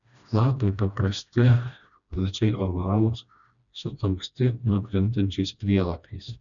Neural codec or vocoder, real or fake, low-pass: codec, 16 kHz, 1 kbps, FreqCodec, smaller model; fake; 7.2 kHz